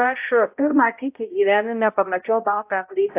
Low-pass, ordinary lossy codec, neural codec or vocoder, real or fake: 3.6 kHz; AAC, 24 kbps; codec, 16 kHz, 0.5 kbps, X-Codec, HuBERT features, trained on balanced general audio; fake